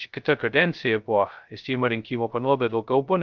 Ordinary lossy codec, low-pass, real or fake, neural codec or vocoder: Opus, 24 kbps; 7.2 kHz; fake; codec, 16 kHz, 0.2 kbps, FocalCodec